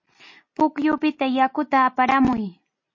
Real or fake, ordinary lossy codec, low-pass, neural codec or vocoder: real; MP3, 32 kbps; 7.2 kHz; none